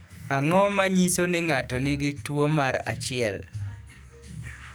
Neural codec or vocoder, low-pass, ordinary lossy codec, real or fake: codec, 44.1 kHz, 2.6 kbps, SNAC; none; none; fake